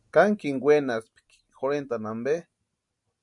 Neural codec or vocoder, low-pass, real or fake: none; 10.8 kHz; real